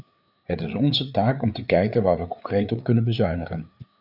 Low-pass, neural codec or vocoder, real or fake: 5.4 kHz; codec, 16 kHz, 4 kbps, FreqCodec, larger model; fake